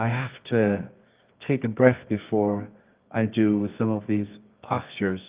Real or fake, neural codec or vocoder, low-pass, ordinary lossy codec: fake; codec, 24 kHz, 0.9 kbps, WavTokenizer, medium music audio release; 3.6 kHz; Opus, 24 kbps